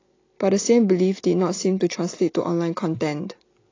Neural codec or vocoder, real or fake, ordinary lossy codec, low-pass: none; real; AAC, 32 kbps; 7.2 kHz